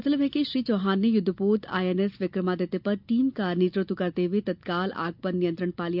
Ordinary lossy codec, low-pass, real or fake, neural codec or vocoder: none; 5.4 kHz; real; none